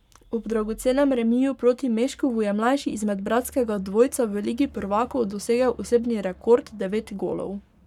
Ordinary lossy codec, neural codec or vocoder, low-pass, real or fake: none; codec, 44.1 kHz, 7.8 kbps, Pupu-Codec; 19.8 kHz; fake